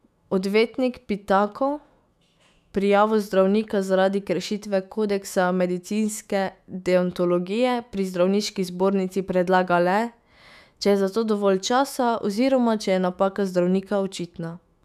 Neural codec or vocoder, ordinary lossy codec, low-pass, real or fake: autoencoder, 48 kHz, 128 numbers a frame, DAC-VAE, trained on Japanese speech; none; 14.4 kHz; fake